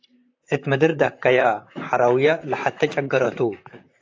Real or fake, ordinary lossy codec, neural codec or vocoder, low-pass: fake; AAC, 48 kbps; vocoder, 44.1 kHz, 128 mel bands, Pupu-Vocoder; 7.2 kHz